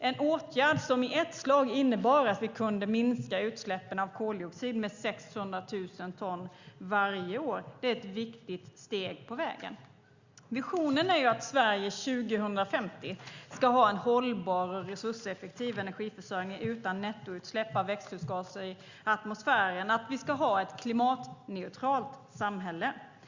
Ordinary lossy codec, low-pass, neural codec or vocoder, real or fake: Opus, 64 kbps; 7.2 kHz; none; real